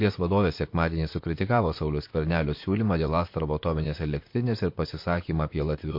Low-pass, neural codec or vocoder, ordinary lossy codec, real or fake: 5.4 kHz; vocoder, 44.1 kHz, 128 mel bands, Pupu-Vocoder; MP3, 32 kbps; fake